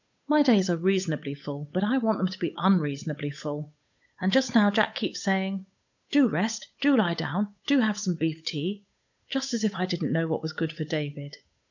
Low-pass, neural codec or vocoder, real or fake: 7.2 kHz; codec, 16 kHz, 8 kbps, FunCodec, trained on Chinese and English, 25 frames a second; fake